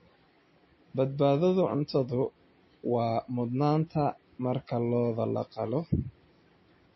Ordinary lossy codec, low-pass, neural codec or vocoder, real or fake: MP3, 24 kbps; 7.2 kHz; none; real